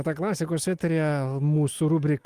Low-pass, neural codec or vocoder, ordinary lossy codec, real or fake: 14.4 kHz; none; Opus, 24 kbps; real